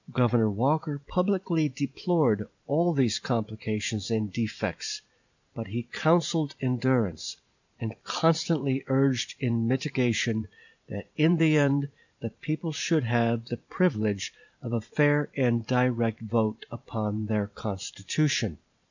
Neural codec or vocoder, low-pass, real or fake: vocoder, 44.1 kHz, 128 mel bands every 512 samples, BigVGAN v2; 7.2 kHz; fake